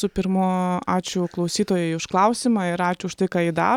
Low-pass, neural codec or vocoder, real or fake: 19.8 kHz; none; real